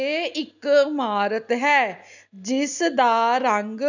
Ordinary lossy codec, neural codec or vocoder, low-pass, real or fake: none; none; 7.2 kHz; real